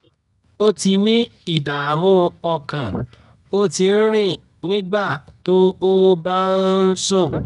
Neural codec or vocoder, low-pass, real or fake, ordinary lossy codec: codec, 24 kHz, 0.9 kbps, WavTokenizer, medium music audio release; 10.8 kHz; fake; none